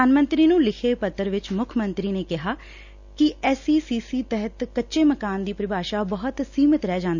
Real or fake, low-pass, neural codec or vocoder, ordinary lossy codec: real; 7.2 kHz; none; none